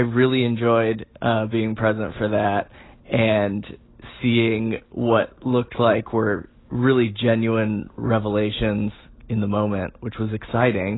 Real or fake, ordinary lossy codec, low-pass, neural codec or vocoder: real; AAC, 16 kbps; 7.2 kHz; none